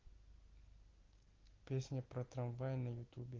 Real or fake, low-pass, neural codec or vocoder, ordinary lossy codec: real; 7.2 kHz; none; Opus, 16 kbps